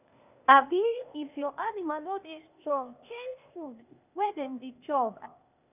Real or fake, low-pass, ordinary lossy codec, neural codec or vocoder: fake; 3.6 kHz; none; codec, 16 kHz, 0.8 kbps, ZipCodec